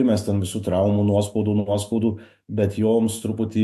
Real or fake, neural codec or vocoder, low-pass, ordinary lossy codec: fake; autoencoder, 48 kHz, 128 numbers a frame, DAC-VAE, trained on Japanese speech; 14.4 kHz; MP3, 64 kbps